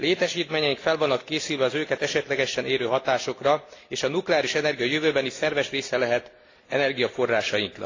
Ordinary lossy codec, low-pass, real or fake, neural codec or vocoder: AAC, 32 kbps; 7.2 kHz; real; none